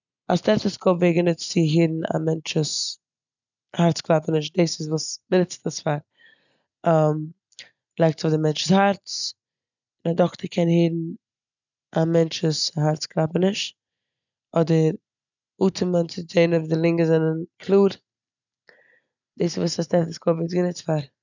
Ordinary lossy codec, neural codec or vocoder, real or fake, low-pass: none; none; real; 7.2 kHz